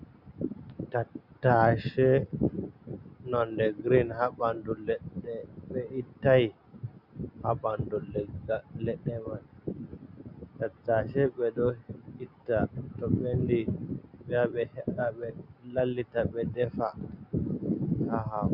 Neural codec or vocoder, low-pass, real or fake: none; 5.4 kHz; real